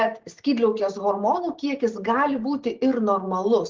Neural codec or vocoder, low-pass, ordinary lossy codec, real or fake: none; 7.2 kHz; Opus, 16 kbps; real